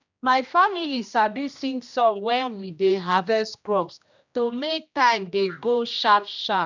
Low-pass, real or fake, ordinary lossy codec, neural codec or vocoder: 7.2 kHz; fake; none; codec, 16 kHz, 1 kbps, X-Codec, HuBERT features, trained on general audio